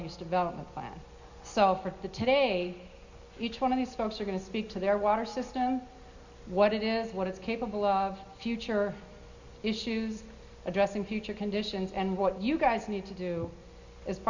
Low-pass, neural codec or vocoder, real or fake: 7.2 kHz; none; real